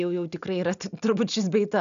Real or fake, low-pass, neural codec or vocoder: real; 7.2 kHz; none